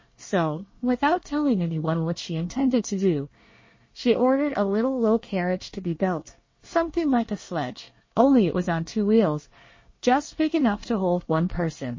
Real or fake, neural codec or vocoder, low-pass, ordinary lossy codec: fake; codec, 24 kHz, 1 kbps, SNAC; 7.2 kHz; MP3, 32 kbps